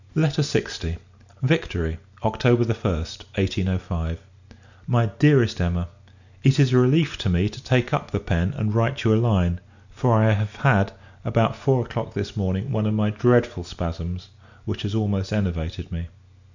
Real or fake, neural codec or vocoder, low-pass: real; none; 7.2 kHz